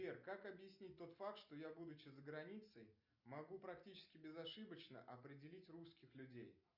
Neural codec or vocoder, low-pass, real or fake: none; 5.4 kHz; real